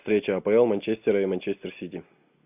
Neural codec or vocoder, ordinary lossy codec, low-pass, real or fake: none; AAC, 32 kbps; 3.6 kHz; real